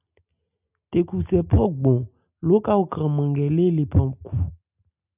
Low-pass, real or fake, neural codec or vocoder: 3.6 kHz; real; none